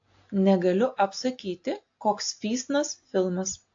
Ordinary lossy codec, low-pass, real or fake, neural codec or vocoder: AAC, 48 kbps; 7.2 kHz; real; none